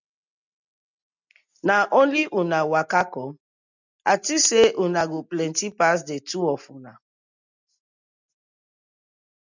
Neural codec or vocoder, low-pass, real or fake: none; 7.2 kHz; real